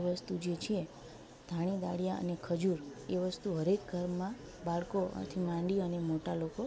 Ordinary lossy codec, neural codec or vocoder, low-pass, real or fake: none; none; none; real